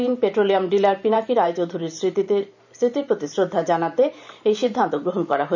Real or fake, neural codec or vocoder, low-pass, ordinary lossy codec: fake; vocoder, 44.1 kHz, 128 mel bands every 512 samples, BigVGAN v2; 7.2 kHz; none